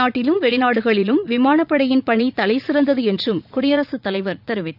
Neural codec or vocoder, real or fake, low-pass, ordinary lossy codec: vocoder, 44.1 kHz, 80 mel bands, Vocos; fake; 5.4 kHz; none